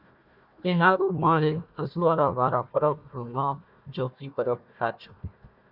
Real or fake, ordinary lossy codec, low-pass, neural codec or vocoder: fake; Opus, 64 kbps; 5.4 kHz; codec, 16 kHz, 1 kbps, FunCodec, trained on Chinese and English, 50 frames a second